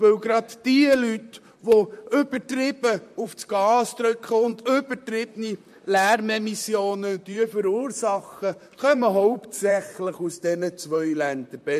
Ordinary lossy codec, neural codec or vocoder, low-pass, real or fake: MP3, 64 kbps; vocoder, 44.1 kHz, 128 mel bands, Pupu-Vocoder; 14.4 kHz; fake